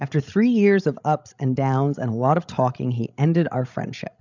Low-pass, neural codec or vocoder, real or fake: 7.2 kHz; codec, 16 kHz, 16 kbps, FreqCodec, larger model; fake